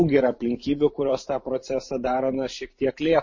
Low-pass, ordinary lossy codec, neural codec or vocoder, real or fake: 7.2 kHz; MP3, 32 kbps; vocoder, 44.1 kHz, 128 mel bands every 512 samples, BigVGAN v2; fake